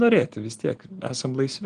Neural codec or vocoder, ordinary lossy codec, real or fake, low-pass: none; Opus, 24 kbps; real; 9.9 kHz